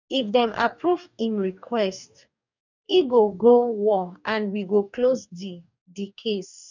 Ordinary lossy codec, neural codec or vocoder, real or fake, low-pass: none; codec, 44.1 kHz, 2.6 kbps, DAC; fake; 7.2 kHz